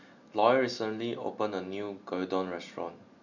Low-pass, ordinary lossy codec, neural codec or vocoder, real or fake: 7.2 kHz; none; none; real